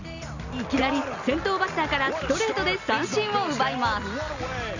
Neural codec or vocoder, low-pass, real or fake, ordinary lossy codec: none; 7.2 kHz; real; none